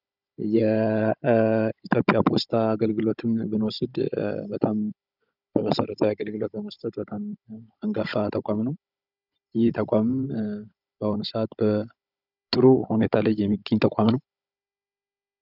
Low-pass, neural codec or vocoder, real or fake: 5.4 kHz; codec, 16 kHz, 16 kbps, FunCodec, trained on Chinese and English, 50 frames a second; fake